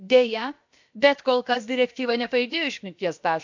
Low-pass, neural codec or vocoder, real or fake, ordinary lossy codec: 7.2 kHz; codec, 16 kHz, about 1 kbps, DyCAST, with the encoder's durations; fake; MP3, 64 kbps